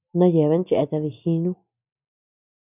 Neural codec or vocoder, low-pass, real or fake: none; 3.6 kHz; real